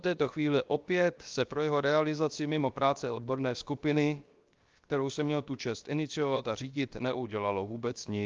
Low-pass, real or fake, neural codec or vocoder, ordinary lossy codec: 7.2 kHz; fake; codec, 16 kHz, 0.7 kbps, FocalCodec; Opus, 32 kbps